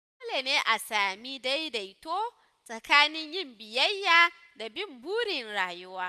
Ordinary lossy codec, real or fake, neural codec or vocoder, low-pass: none; real; none; 14.4 kHz